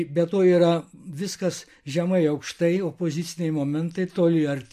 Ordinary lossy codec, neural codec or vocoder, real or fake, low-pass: MP3, 64 kbps; none; real; 14.4 kHz